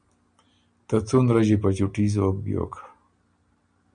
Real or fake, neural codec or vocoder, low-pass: real; none; 9.9 kHz